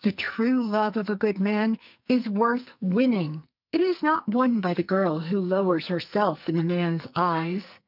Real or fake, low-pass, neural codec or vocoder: fake; 5.4 kHz; codec, 44.1 kHz, 2.6 kbps, SNAC